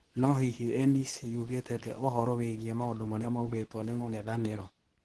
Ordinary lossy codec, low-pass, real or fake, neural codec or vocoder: Opus, 16 kbps; 10.8 kHz; fake; codec, 24 kHz, 0.9 kbps, WavTokenizer, medium speech release version 2